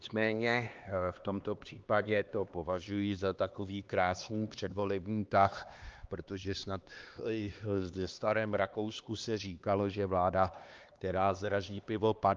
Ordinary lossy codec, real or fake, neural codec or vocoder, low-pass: Opus, 24 kbps; fake; codec, 16 kHz, 2 kbps, X-Codec, HuBERT features, trained on LibriSpeech; 7.2 kHz